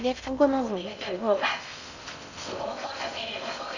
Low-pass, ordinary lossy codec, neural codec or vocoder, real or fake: 7.2 kHz; none; codec, 16 kHz in and 24 kHz out, 0.6 kbps, FocalCodec, streaming, 2048 codes; fake